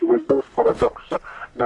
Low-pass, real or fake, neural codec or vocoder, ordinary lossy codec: 10.8 kHz; fake; codec, 44.1 kHz, 1.7 kbps, Pupu-Codec; MP3, 96 kbps